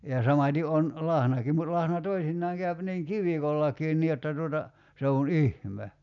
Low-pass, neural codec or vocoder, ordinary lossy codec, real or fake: 7.2 kHz; none; none; real